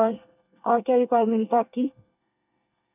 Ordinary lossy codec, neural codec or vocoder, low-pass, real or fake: none; codec, 24 kHz, 1 kbps, SNAC; 3.6 kHz; fake